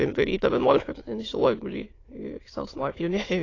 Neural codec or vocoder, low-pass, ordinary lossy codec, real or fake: autoencoder, 22.05 kHz, a latent of 192 numbers a frame, VITS, trained on many speakers; 7.2 kHz; AAC, 32 kbps; fake